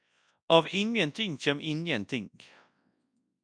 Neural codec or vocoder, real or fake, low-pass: codec, 24 kHz, 0.9 kbps, WavTokenizer, large speech release; fake; 9.9 kHz